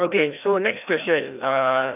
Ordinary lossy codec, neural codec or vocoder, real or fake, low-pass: none; codec, 16 kHz, 1 kbps, FreqCodec, larger model; fake; 3.6 kHz